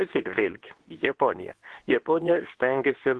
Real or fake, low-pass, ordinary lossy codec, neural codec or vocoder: fake; 10.8 kHz; Opus, 16 kbps; codec, 24 kHz, 0.9 kbps, WavTokenizer, medium speech release version 2